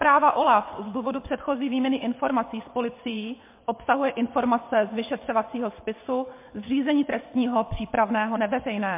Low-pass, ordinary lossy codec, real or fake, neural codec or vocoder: 3.6 kHz; MP3, 24 kbps; fake; vocoder, 22.05 kHz, 80 mel bands, WaveNeXt